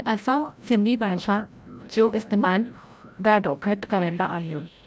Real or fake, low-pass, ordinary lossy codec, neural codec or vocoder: fake; none; none; codec, 16 kHz, 0.5 kbps, FreqCodec, larger model